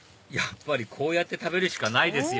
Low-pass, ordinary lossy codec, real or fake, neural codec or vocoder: none; none; real; none